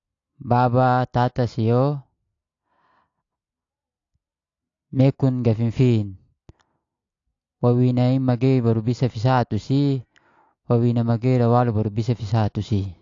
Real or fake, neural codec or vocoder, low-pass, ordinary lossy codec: real; none; 7.2 kHz; AAC, 48 kbps